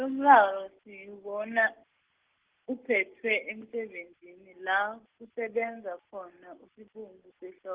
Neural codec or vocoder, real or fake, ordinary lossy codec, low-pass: none; real; Opus, 16 kbps; 3.6 kHz